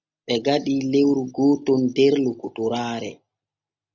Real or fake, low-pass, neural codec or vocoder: real; 7.2 kHz; none